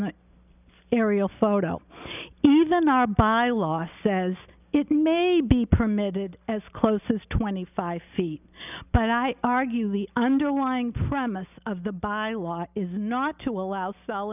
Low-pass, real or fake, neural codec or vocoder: 3.6 kHz; real; none